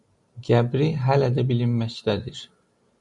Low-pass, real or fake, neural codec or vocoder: 10.8 kHz; real; none